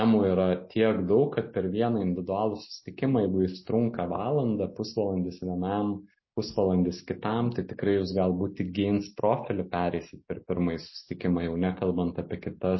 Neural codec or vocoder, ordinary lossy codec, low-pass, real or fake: none; MP3, 24 kbps; 7.2 kHz; real